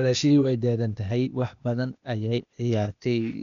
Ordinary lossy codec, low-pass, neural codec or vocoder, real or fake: none; 7.2 kHz; codec, 16 kHz, 0.8 kbps, ZipCodec; fake